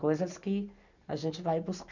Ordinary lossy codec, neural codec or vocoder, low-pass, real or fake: none; none; 7.2 kHz; real